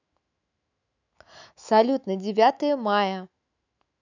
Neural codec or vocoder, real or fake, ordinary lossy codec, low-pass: autoencoder, 48 kHz, 128 numbers a frame, DAC-VAE, trained on Japanese speech; fake; none; 7.2 kHz